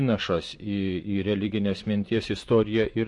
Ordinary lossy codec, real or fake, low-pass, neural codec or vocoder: MP3, 48 kbps; fake; 10.8 kHz; vocoder, 24 kHz, 100 mel bands, Vocos